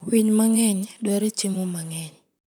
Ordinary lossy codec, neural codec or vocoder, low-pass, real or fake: none; vocoder, 44.1 kHz, 128 mel bands, Pupu-Vocoder; none; fake